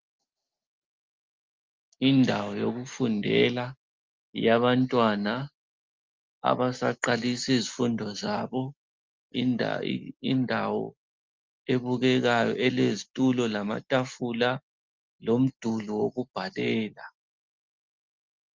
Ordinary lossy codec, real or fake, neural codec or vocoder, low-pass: Opus, 32 kbps; real; none; 7.2 kHz